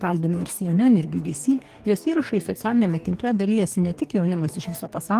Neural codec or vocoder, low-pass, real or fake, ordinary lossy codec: codec, 44.1 kHz, 2.6 kbps, DAC; 14.4 kHz; fake; Opus, 24 kbps